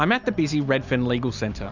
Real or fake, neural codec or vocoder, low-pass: real; none; 7.2 kHz